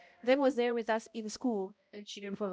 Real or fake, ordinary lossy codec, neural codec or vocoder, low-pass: fake; none; codec, 16 kHz, 0.5 kbps, X-Codec, HuBERT features, trained on balanced general audio; none